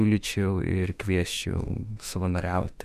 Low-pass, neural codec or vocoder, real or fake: 14.4 kHz; autoencoder, 48 kHz, 32 numbers a frame, DAC-VAE, trained on Japanese speech; fake